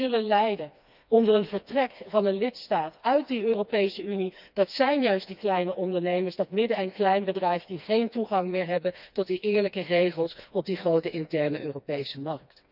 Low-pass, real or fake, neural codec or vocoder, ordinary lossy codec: 5.4 kHz; fake; codec, 16 kHz, 2 kbps, FreqCodec, smaller model; none